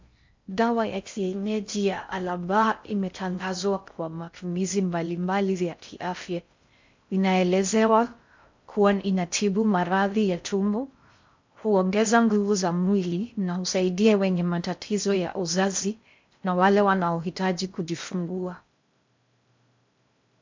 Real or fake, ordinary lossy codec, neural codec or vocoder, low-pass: fake; MP3, 64 kbps; codec, 16 kHz in and 24 kHz out, 0.6 kbps, FocalCodec, streaming, 2048 codes; 7.2 kHz